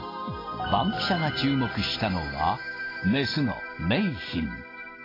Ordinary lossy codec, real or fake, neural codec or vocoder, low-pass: AAC, 24 kbps; real; none; 5.4 kHz